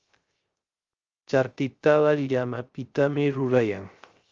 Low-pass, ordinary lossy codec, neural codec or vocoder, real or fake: 7.2 kHz; Opus, 32 kbps; codec, 16 kHz, 0.3 kbps, FocalCodec; fake